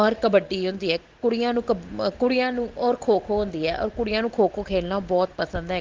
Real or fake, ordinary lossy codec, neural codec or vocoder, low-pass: real; Opus, 16 kbps; none; 7.2 kHz